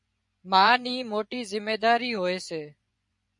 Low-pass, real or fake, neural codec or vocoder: 10.8 kHz; fake; vocoder, 24 kHz, 100 mel bands, Vocos